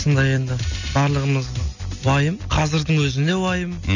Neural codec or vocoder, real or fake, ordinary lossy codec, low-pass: none; real; none; 7.2 kHz